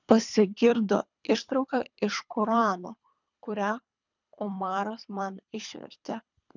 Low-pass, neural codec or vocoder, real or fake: 7.2 kHz; codec, 24 kHz, 3 kbps, HILCodec; fake